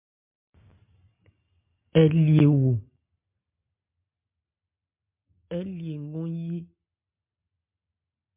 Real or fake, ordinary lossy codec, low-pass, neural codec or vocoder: real; MP3, 32 kbps; 3.6 kHz; none